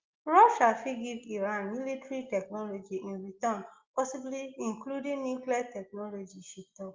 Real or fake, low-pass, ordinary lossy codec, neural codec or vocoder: real; 7.2 kHz; Opus, 32 kbps; none